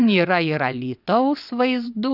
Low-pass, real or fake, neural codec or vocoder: 5.4 kHz; fake; vocoder, 22.05 kHz, 80 mel bands, WaveNeXt